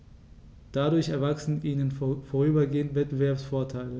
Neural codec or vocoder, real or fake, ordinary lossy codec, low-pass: none; real; none; none